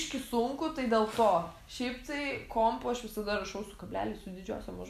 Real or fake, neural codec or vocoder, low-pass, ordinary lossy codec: real; none; 14.4 kHz; AAC, 96 kbps